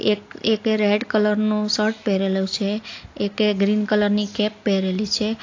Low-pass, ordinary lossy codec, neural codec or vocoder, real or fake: 7.2 kHz; AAC, 48 kbps; none; real